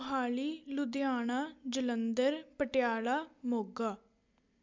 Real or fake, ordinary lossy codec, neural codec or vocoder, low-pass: real; MP3, 64 kbps; none; 7.2 kHz